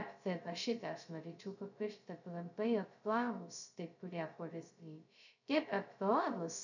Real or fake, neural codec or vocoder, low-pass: fake; codec, 16 kHz, 0.2 kbps, FocalCodec; 7.2 kHz